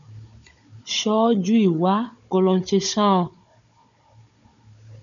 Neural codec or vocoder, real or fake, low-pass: codec, 16 kHz, 16 kbps, FunCodec, trained on Chinese and English, 50 frames a second; fake; 7.2 kHz